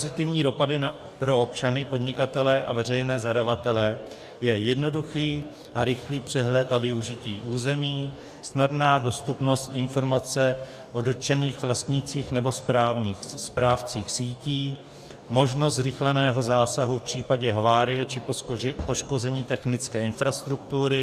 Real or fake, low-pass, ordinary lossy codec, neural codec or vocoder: fake; 14.4 kHz; AAC, 96 kbps; codec, 44.1 kHz, 2.6 kbps, DAC